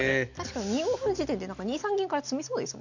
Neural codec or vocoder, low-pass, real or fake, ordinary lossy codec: none; 7.2 kHz; real; none